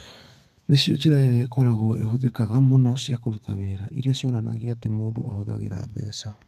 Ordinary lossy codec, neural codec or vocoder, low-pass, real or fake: none; codec, 32 kHz, 1.9 kbps, SNAC; 14.4 kHz; fake